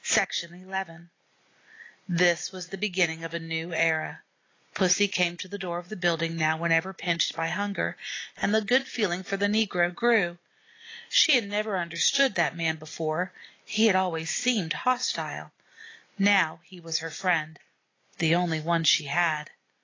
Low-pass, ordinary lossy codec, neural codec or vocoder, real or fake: 7.2 kHz; AAC, 32 kbps; none; real